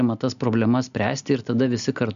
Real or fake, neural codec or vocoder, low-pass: real; none; 7.2 kHz